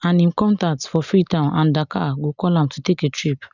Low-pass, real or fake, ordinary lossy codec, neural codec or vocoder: 7.2 kHz; real; none; none